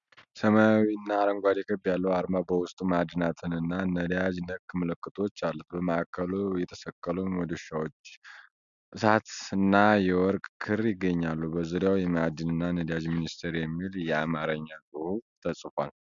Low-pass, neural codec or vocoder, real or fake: 7.2 kHz; none; real